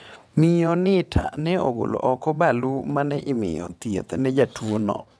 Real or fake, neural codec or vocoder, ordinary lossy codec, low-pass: fake; vocoder, 22.05 kHz, 80 mel bands, WaveNeXt; none; none